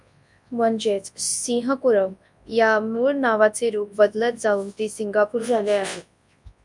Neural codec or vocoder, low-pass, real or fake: codec, 24 kHz, 0.9 kbps, WavTokenizer, large speech release; 10.8 kHz; fake